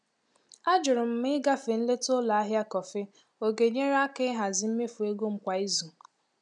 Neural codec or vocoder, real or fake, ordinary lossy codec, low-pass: none; real; none; 10.8 kHz